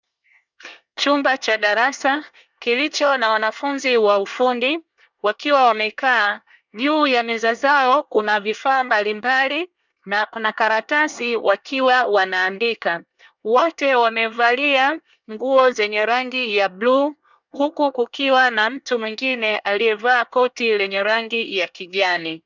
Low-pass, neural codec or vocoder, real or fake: 7.2 kHz; codec, 24 kHz, 1 kbps, SNAC; fake